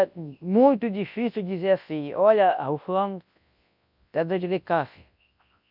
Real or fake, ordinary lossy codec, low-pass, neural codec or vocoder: fake; none; 5.4 kHz; codec, 24 kHz, 0.9 kbps, WavTokenizer, large speech release